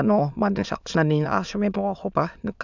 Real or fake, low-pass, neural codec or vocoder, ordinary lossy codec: fake; 7.2 kHz; autoencoder, 22.05 kHz, a latent of 192 numbers a frame, VITS, trained on many speakers; none